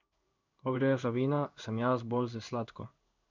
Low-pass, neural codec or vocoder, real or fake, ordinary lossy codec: 7.2 kHz; codec, 16 kHz in and 24 kHz out, 1 kbps, XY-Tokenizer; fake; none